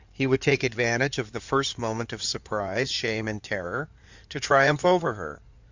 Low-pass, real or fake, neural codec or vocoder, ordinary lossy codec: 7.2 kHz; fake; codec, 16 kHz in and 24 kHz out, 2.2 kbps, FireRedTTS-2 codec; Opus, 64 kbps